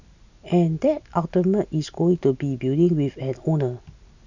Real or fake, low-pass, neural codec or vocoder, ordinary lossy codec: real; 7.2 kHz; none; none